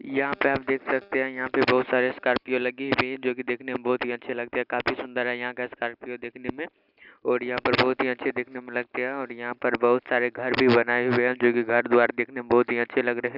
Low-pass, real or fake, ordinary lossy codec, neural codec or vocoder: 5.4 kHz; real; none; none